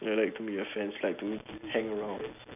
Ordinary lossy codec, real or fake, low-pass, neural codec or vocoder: none; real; 3.6 kHz; none